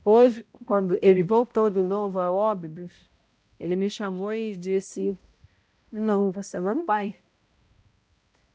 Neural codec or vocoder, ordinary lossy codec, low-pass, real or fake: codec, 16 kHz, 0.5 kbps, X-Codec, HuBERT features, trained on balanced general audio; none; none; fake